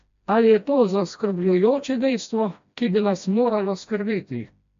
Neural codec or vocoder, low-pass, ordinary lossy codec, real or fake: codec, 16 kHz, 1 kbps, FreqCodec, smaller model; 7.2 kHz; AAC, 64 kbps; fake